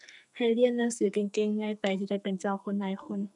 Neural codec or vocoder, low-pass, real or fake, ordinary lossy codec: codec, 44.1 kHz, 2.6 kbps, SNAC; 10.8 kHz; fake; none